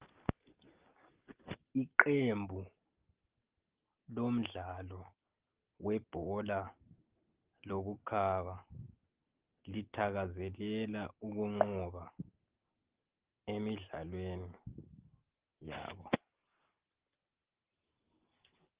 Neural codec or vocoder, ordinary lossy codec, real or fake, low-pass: none; Opus, 16 kbps; real; 3.6 kHz